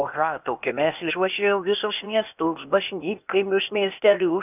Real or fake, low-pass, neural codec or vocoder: fake; 3.6 kHz; codec, 16 kHz, 0.8 kbps, ZipCodec